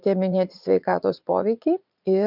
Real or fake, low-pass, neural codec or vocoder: fake; 5.4 kHz; vocoder, 44.1 kHz, 80 mel bands, Vocos